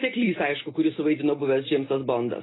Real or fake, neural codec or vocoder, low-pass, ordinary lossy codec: real; none; 7.2 kHz; AAC, 16 kbps